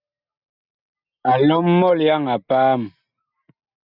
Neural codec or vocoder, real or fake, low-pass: none; real; 5.4 kHz